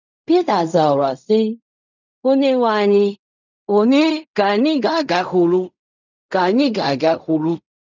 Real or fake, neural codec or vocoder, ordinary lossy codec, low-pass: fake; codec, 16 kHz in and 24 kHz out, 0.4 kbps, LongCat-Audio-Codec, fine tuned four codebook decoder; none; 7.2 kHz